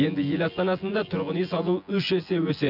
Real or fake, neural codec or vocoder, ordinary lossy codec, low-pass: fake; vocoder, 24 kHz, 100 mel bands, Vocos; none; 5.4 kHz